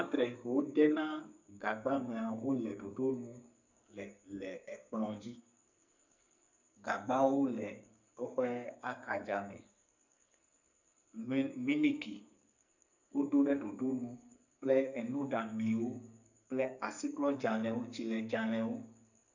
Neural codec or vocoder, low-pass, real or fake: codec, 44.1 kHz, 2.6 kbps, SNAC; 7.2 kHz; fake